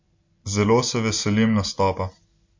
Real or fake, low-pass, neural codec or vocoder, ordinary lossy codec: real; 7.2 kHz; none; MP3, 48 kbps